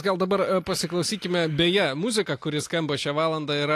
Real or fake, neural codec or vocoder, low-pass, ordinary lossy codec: real; none; 14.4 kHz; AAC, 64 kbps